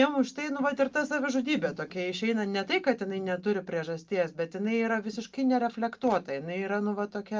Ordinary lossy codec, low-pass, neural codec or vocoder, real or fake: Opus, 24 kbps; 7.2 kHz; none; real